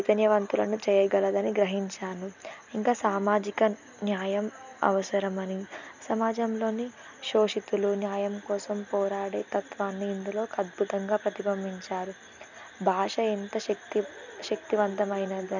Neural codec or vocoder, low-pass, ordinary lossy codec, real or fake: none; 7.2 kHz; none; real